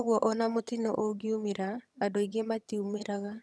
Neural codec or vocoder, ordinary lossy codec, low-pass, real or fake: vocoder, 22.05 kHz, 80 mel bands, HiFi-GAN; none; none; fake